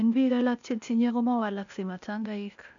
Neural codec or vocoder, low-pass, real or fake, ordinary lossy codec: codec, 16 kHz, 0.8 kbps, ZipCodec; 7.2 kHz; fake; none